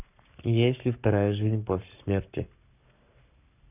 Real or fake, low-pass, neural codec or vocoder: fake; 3.6 kHz; codec, 44.1 kHz, 7.8 kbps, Pupu-Codec